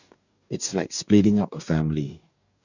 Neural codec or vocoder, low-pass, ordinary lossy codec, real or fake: codec, 44.1 kHz, 2.6 kbps, DAC; 7.2 kHz; none; fake